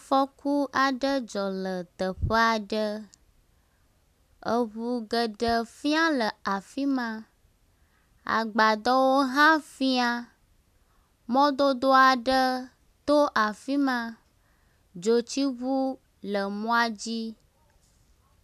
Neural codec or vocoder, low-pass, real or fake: none; 14.4 kHz; real